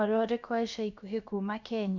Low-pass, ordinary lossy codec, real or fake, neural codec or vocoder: 7.2 kHz; none; fake; codec, 16 kHz, about 1 kbps, DyCAST, with the encoder's durations